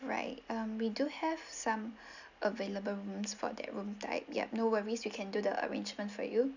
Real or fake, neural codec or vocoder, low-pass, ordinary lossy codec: real; none; 7.2 kHz; none